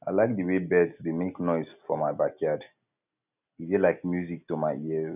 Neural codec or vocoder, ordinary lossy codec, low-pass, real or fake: none; none; 3.6 kHz; real